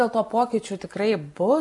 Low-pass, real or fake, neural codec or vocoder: 10.8 kHz; real; none